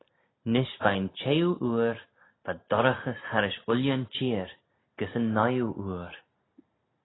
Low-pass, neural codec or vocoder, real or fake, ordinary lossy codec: 7.2 kHz; none; real; AAC, 16 kbps